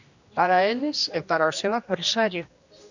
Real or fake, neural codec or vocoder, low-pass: fake; codec, 16 kHz, 1 kbps, X-Codec, HuBERT features, trained on general audio; 7.2 kHz